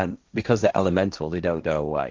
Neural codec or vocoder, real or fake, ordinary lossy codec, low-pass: codec, 16 kHz, 1.1 kbps, Voila-Tokenizer; fake; Opus, 32 kbps; 7.2 kHz